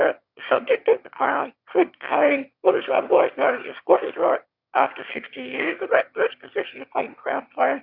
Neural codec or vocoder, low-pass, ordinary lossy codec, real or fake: autoencoder, 22.05 kHz, a latent of 192 numbers a frame, VITS, trained on one speaker; 5.4 kHz; Opus, 64 kbps; fake